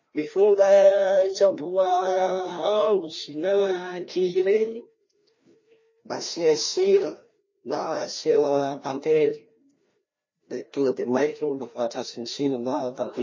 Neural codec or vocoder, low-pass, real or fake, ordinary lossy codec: codec, 16 kHz, 1 kbps, FreqCodec, larger model; 7.2 kHz; fake; MP3, 32 kbps